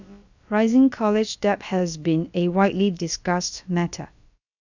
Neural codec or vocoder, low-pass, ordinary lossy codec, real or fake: codec, 16 kHz, about 1 kbps, DyCAST, with the encoder's durations; 7.2 kHz; none; fake